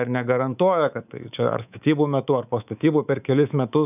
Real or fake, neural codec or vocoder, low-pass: fake; codec, 24 kHz, 3.1 kbps, DualCodec; 3.6 kHz